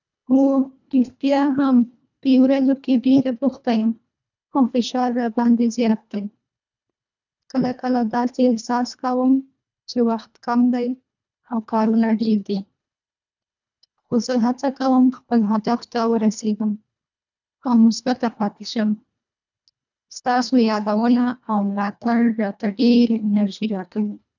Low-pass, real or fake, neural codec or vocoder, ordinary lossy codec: 7.2 kHz; fake; codec, 24 kHz, 1.5 kbps, HILCodec; none